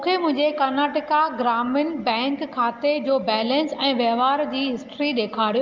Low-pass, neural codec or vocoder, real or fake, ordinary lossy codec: 7.2 kHz; none; real; Opus, 24 kbps